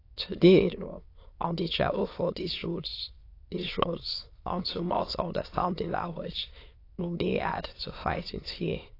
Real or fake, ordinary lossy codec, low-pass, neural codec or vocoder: fake; AAC, 32 kbps; 5.4 kHz; autoencoder, 22.05 kHz, a latent of 192 numbers a frame, VITS, trained on many speakers